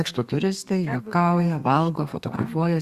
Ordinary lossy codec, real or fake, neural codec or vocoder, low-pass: Opus, 64 kbps; fake; codec, 32 kHz, 1.9 kbps, SNAC; 14.4 kHz